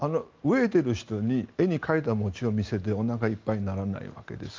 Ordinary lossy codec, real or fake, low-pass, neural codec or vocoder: Opus, 32 kbps; real; 7.2 kHz; none